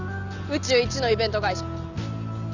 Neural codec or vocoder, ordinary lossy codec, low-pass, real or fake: none; none; 7.2 kHz; real